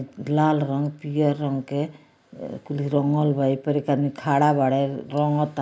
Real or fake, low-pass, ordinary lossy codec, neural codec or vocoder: real; none; none; none